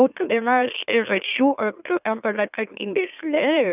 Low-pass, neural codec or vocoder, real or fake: 3.6 kHz; autoencoder, 44.1 kHz, a latent of 192 numbers a frame, MeloTTS; fake